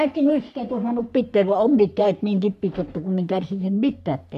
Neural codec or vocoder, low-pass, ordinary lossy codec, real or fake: codec, 44.1 kHz, 3.4 kbps, Pupu-Codec; 14.4 kHz; none; fake